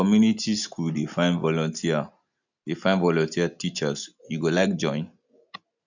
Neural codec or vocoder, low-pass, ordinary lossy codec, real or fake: none; 7.2 kHz; none; real